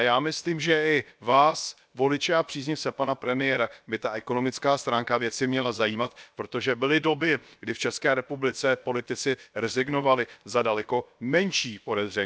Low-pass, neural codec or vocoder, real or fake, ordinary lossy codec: none; codec, 16 kHz, about 1 kbps, DyCAST, with the encoder's durations; fake; none